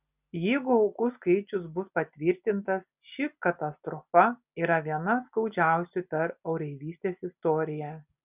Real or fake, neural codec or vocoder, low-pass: real; none; 3.6 kHz